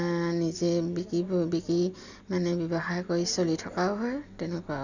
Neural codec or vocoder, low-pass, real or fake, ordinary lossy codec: none; 7.2 kHz; real; none